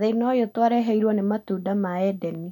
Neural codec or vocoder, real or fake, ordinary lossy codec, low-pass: none; real; none; 19.8 kHz